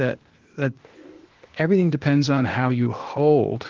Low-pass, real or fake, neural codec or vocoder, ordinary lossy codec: 7.2 kHz; fake; codec, 16 kHz, 0.8 kbps, ZipCodec; Opus, 16 kbps